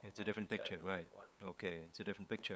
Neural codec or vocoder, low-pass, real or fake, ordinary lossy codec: codec, 16 kHz, 8 kbps, FunCodec, trained on LibriTTS, 25 frames a second; none; fake; none